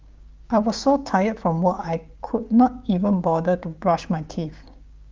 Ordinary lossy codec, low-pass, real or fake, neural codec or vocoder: Opus, 32 kbps; 7.2 kHz; fake; codec, 16 kHz, 6 kbps, DAC